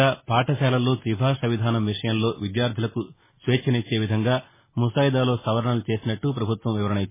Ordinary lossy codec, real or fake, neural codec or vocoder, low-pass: MP3, 16 kbps; real; none; 3.6 kHz